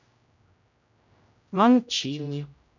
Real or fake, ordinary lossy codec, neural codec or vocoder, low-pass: fake; none; codec, 16 kHz, 0.5 kbps, X-Codec, HuBERT features, trained on general audio; 7.2 kHz